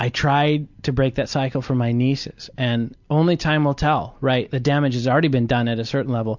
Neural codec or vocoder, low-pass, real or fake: none; 7.2 kHz; real